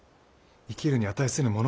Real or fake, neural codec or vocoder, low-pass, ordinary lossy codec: real; none; none; none